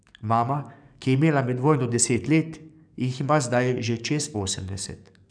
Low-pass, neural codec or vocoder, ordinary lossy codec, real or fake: 9.9 kHz; codec, 44.1 kHz, 7.8 kbps, DAC; none; fake